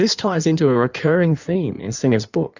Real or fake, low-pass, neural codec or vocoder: fake; 7.2 kHz; codec, 16 kHz in and 24 kHz out, 1.1 kbps, FireRedTTS-2 codec